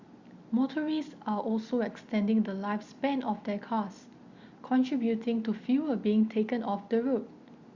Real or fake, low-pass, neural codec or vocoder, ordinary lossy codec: real; 7.2 kHz; none; Opus, 64 kbps